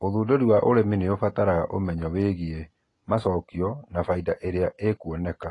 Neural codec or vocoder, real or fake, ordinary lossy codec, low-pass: none; real; AAC, 32 kbps; 10.8 kHz